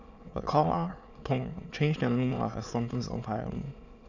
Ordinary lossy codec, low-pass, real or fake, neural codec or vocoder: none; 7.2 kHz; fake; autoencoder, 22.05 kHz, a latent of 192 numbers a frame, VITS, trained on many speakers